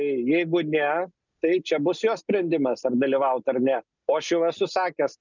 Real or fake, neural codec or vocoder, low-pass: real; none; 7.2 kHz